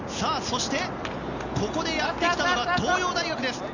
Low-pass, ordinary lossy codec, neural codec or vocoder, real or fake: 7.2 kHz; none; none; real